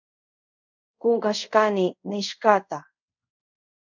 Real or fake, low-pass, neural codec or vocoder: fake; 7.2 kHz; codec, 24 kHz, 0.5 kbps, DualCodec